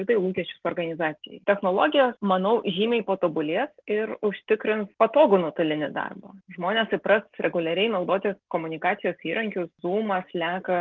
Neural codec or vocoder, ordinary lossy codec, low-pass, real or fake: none; Opus, 32 kbps; 7.2 kHz; real